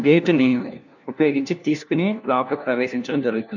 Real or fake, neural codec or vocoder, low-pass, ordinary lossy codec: fake; codec, 16 kHz, 1 kbps, FunCodec, trained on LibriTTS, 50 frames a second; 7.2 kHz; none